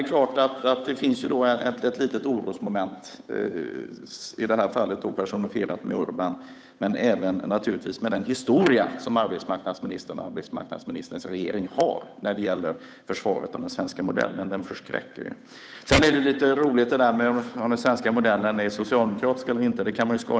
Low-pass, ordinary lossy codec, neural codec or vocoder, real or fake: none; none; codec, 16 kHz, 8 kbps, FunCodec, trained on Chinese and English, 25 frames a second; fake